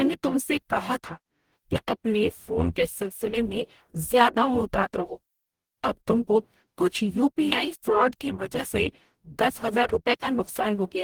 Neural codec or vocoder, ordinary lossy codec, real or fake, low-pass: codec, 44.1 kHz, 0.9 kbps, DAC; Opus, 24 kbps; fake; 19.8 kHz